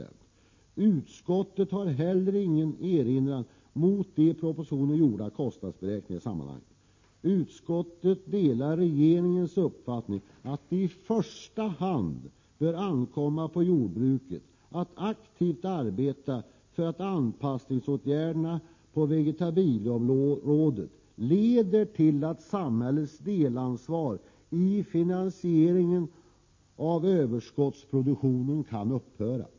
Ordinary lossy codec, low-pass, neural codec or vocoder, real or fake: MP3, 32 kbps; 7.2 kHz; none; real